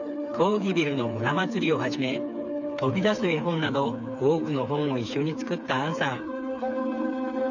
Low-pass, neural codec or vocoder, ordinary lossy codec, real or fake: 7.2 kHz; codec, 16 kHz, 4 kbps, FreqCodec, smaller model; none; fake